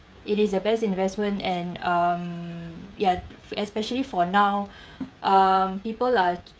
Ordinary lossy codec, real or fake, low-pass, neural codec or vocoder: none; fake; none; codec, 16 kHz, 16 kbps, FreqCodec, smaller model